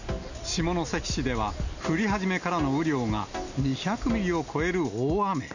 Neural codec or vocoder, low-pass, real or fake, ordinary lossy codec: none; 7.2 kHz; real; none